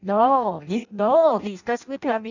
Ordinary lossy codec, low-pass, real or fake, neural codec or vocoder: none; 7.2 kHz; fake; codec, 16 kHz in and 24 kHz out, 0.6 kbps, FireRedTTS-2 codec